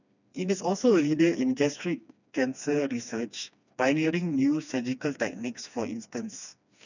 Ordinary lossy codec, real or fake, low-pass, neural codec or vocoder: none; fake; 7.2 kHz; codec, 16 kHz, 2 kbps, FreqCodec, smaller model